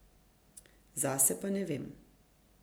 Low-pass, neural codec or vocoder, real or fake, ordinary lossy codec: none; none; real; none